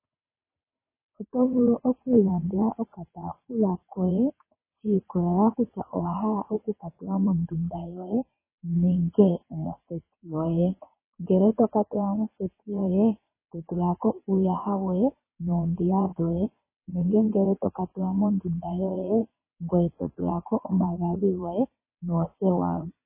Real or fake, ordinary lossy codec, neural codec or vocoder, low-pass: fake; MP3, 16 kbps; vocoder, 22.05 kHz, 80 mel bands, WaveNeXt; 3.6 kHz